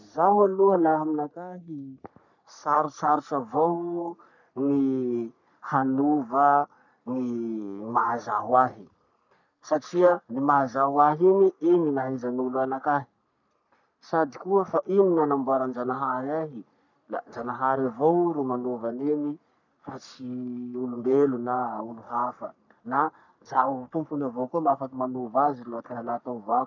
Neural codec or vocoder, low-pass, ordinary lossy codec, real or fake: codec, 44.1 kHz, 3.4 kbps, Pupu-Codec; 7.2 kHz; none; fake